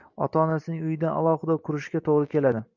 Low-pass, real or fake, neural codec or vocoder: 7.2 kHz; real; none